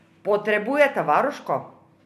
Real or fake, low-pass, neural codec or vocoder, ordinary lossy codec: real; 14.4 kHz; none; none